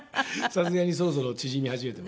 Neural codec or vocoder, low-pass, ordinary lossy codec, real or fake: none; none; none; real